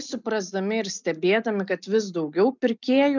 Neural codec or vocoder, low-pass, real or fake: none; 7.2 kHz; real